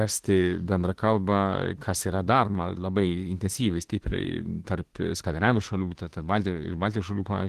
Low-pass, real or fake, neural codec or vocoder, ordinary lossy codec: 14.4 kHz; fake; autoencoder, 48 kHz, 32 numbers a frame, DAC-VAE, trained on Japanese speech; Opus, 16 kbps